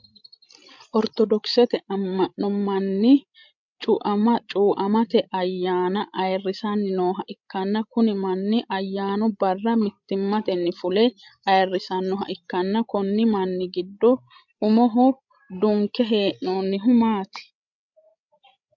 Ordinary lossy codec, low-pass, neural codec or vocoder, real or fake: MP3, 64 kbps; 7.2 kHz; codec, 16 kHz, 16 kbps, FreqCodec, larger model; fake